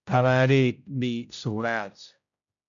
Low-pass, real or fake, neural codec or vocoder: 7.2 kHz; fake; codec, 16 kHz, 0.5 kbps, X-Codec, HuBERT features, trained on general audio